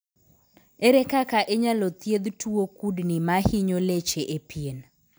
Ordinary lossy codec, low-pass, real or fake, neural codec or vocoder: none; none; real; none